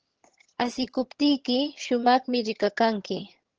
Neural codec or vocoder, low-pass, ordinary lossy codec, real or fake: vocoder, 22.05 kHz, 80 mel bands, HiFi-GAN; 7.2 kHz; Opus, 16 kbps; fake